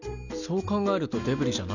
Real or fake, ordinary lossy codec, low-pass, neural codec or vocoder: real; none; 7.2 kHz; none